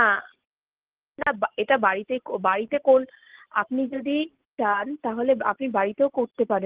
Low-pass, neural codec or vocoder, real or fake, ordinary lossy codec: 3.6 kHz; none; real; Opus, 16 kbps